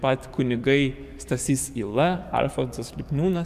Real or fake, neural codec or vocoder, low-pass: fake; codec, 44.1 kHz, 7.8 kbps, DAC; 14.4 kHz